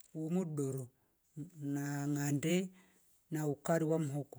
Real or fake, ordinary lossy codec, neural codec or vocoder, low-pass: fake; none; vocoder, 48 kHz, 128 mel bands, Vocos; none